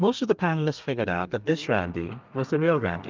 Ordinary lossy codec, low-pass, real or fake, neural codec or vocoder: Opus, 24 kbps; 7.2 kHz; fake; codec, 32 kHz, 1.9 kbps, SNAC